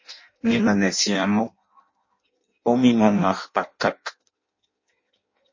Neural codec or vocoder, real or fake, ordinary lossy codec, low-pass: codec, 16 kHz in and 24 kHz out, 0.6 kbps, FireRedTTS-2 codec; fake; MP3, 32 kbps; 7.2 kHz